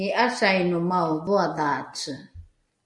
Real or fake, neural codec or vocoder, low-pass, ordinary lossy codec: real; none; 10.8 kHz; MP3, 48 kbps